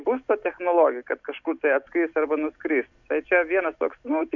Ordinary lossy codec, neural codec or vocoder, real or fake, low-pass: MP3, 64 kbps; none; real; 7.2 kHz